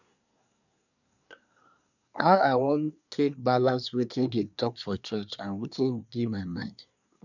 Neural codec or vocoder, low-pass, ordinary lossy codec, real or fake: codec, 24 kHz, 1 kbps, SNAC; 7.2 kHz; none; fake